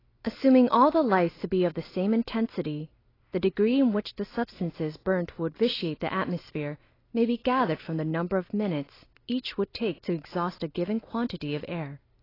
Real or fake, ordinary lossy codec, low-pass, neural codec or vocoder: real; AAC, 24 kbps; 5.4 kHz; none